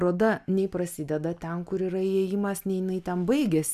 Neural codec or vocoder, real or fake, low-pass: none; real; 14.4 kHz